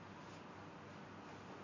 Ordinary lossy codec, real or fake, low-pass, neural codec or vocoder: MP3, 64 kbps; real; 7.2 kHz; none